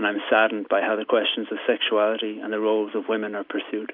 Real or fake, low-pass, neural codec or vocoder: real; 5.4 kHz; none